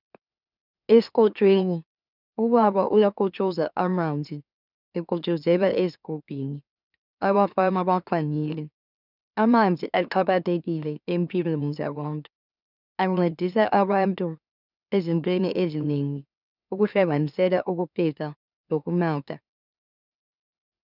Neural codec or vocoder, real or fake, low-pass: autoencoder, 44.1 kHz, a latent of 192 numbers a frame, MeloTTS; fake; 5.4 kHz